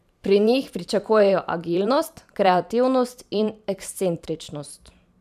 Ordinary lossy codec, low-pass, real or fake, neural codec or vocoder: none; 14.4 kHz; fake; vocoder, 44.1 kHz, 128 mel bands every 512 samples, BigVGAN v2